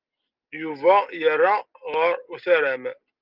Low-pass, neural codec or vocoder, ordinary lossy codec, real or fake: 5.4 kHz; none; Opus, 32 kbps; real